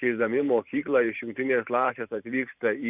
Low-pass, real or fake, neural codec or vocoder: 3.6 kHz; fake; vocoder, 44.1 kHz, 128 mel bands every 512 samples, BigVGAN v2